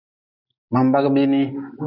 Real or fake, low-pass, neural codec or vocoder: real; 5.4 kHz; none